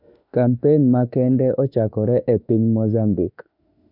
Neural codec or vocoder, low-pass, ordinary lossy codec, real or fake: autoencoder, 48 kHz, 32 numbers a frame, DAC-VAE, trained on Japanese speech; 5.4 kHz; none; fake